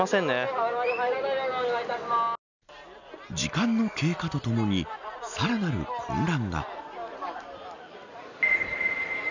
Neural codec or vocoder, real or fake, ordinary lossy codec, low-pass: none; real; none; 7.2 kHz